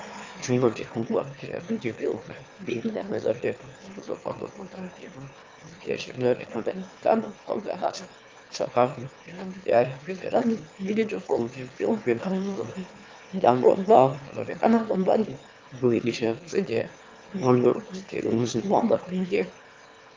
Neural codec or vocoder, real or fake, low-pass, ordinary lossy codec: autoencoder, 22.05 kHz, a latent of 192 numbers a frame, VITS, trained on one speaker; fake; 7.2 kHz; Opus, 32 kbps